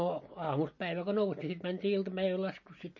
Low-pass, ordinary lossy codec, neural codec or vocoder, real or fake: 7.2 kHz; MP3, 32 kbps; none; real